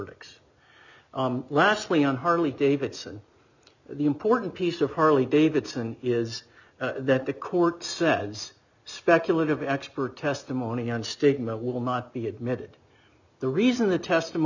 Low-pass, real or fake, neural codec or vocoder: 7.2 kHz; real; none